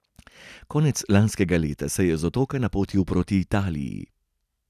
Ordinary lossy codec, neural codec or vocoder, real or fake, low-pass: none; none; real; 14.4 kHz